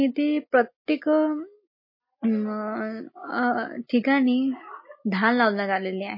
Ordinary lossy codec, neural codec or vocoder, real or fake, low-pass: MP3, 24 kbps; none; real; 5.4 kHz